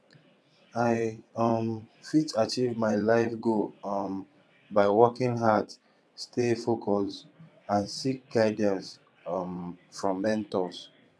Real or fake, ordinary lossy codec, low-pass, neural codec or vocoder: fake; none; none; vocoder, 22.05 kHz, 80 mel bands, WaveNeXt